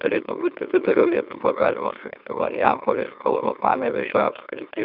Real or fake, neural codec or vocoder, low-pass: fake; autoencoder, 44.1 kHz, a latent of 192 numbers a frame, MeloTTS; 5.4 kHz